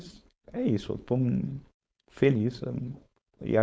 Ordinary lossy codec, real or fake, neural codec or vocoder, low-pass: none; fake; codec, 16 kHz, 4.8 kbps, FACodec; none